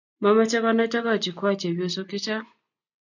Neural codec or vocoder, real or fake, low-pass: none; real; 7.2 kHz